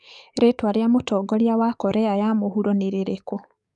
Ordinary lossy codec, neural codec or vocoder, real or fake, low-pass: none; codec, 44.1 kHz, 7.8 kbps, DAC; fake; 10.8 kHz